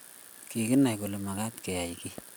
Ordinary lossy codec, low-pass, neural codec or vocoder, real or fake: none; none; none; real